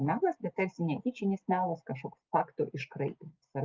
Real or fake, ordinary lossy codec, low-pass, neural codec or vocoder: real; Opus, 32 kbps; 7.2 kHz; none